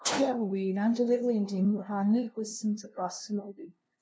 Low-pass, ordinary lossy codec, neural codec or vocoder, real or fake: none; none; codec, 16 kHz, 0.5 kbps, FunCodec, trained on LibriTTS, 25 frames a second; fake